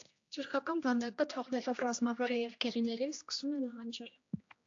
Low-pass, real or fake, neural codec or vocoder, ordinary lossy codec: 7.2 kHz; fake; codec, 16 kHz, 1 kbps, X-Codec, HuBERT features, trained on general audio; AAC, 48 kbps